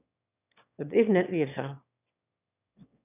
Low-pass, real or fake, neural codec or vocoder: 3.6 kHz; fake; autoencoder, 22.05 kHz, a latent of 192 numbers a frame, VITS, trained on one speaker